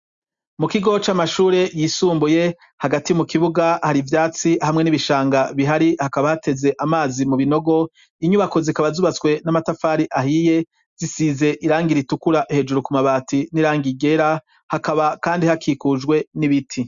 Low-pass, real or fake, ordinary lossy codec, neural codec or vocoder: 7.2 kHz; real; Opus, 64 kbps; none